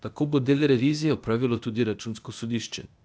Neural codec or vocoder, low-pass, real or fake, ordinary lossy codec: codec, 16 kHz, 0.8 kbps, ZipCodec; none; fake; none